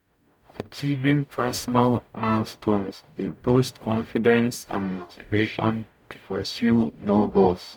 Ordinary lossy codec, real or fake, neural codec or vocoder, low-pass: none; fake; codec, 44.1 kHz, 0.9 kbps, DAC; 19.8 kHz